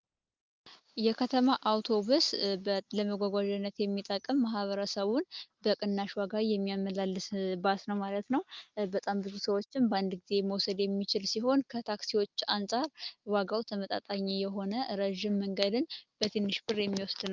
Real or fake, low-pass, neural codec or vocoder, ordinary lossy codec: real; 7.2 kHz; none; Opus, 24 kbps